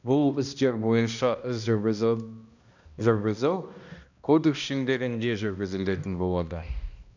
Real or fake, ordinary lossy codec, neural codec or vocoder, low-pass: fake; none; codec, 16 kHz, 1 kbps, X-Codec, HuBERT features, trained on balanced general audio; 7.2 kHz